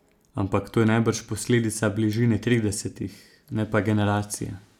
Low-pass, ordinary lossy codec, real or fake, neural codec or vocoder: 19.8 kHz; none; real; none